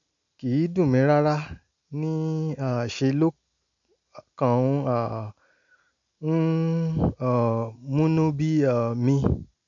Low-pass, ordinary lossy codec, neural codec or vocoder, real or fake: 7.2 kHz; none; none; real